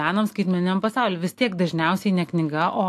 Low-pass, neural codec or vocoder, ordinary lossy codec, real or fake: 14.4 kHz; none; AAC, 64 kbps; real